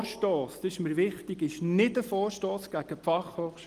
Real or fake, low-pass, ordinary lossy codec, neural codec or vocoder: real; 14.4 kHz; Opus, 24 kbps; none